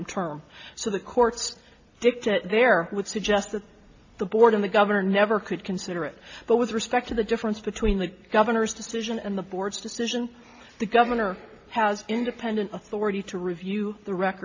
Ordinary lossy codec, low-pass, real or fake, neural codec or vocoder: AAC, 48 kbps; 7.2 kHz; real; none